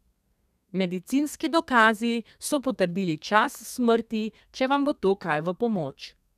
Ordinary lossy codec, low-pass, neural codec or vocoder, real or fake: none; 14.4 kHz; codec, 32 kHz, 1.9 kbps, SNAC; fake